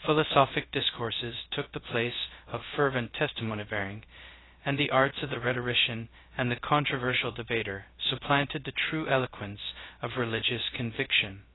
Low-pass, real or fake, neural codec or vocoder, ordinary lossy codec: 7.2 kHz; fake; codec, 16 kHz, 0.2 kbps, FocalCodec; AAC, 16 kbps